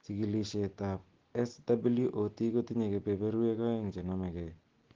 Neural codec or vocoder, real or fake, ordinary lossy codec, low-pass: none; real; Opus, 16 kbps; 7.2 kHz